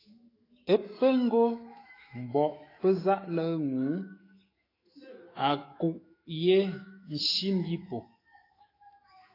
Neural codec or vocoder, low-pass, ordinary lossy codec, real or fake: autoencoder, 48 kHz, 128 numbers a frame, DAC-VAE, trained on Japanese speech; 5.4 kHz; AAC, 24 kbps; fake